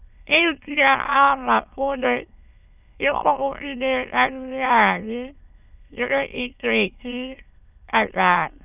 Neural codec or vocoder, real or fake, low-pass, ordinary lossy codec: autoencoder, 22.05 kHz, a latent of 192 numbers a frame, VITS, trained on many speakers; fake; 3.6 kHz; none